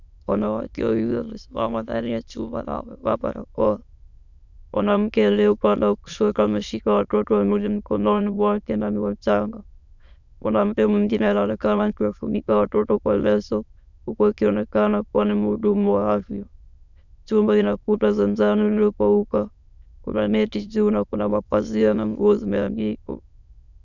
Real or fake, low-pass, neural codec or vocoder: fake; 7.2 kHz; autoencoder, 22.05 kHz, a latent of 192 numbers a frame, VITS, trained on many speakers